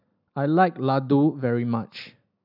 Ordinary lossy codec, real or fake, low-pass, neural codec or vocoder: none; real; 5.4 kHz; none